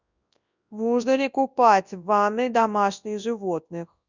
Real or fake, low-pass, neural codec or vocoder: fake; 7.2 kHz; codec, 24 kHz, 0.9 kbps, WavTokenizer, large speech release